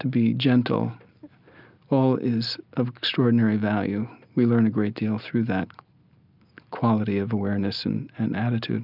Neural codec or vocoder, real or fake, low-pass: none; real; 5.4 kHz